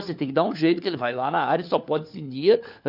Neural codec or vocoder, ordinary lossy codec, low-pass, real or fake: codec, 16 kHz, 4 kbps, FunCodec, trained on LibriTTS, 50 frames a second; none; 5.4 kHz; fake